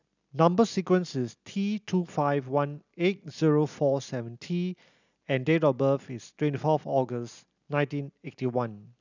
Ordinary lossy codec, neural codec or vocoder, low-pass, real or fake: none; none; 7.2 kHz; real